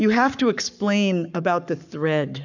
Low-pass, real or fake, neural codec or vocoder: 7.2 kHz; fake; codec, 44.1 kHz, 7.8 kbps, Pupu-Codec